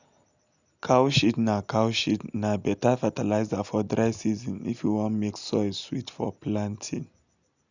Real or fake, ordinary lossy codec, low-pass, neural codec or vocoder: real; none; 7.2 kHz; none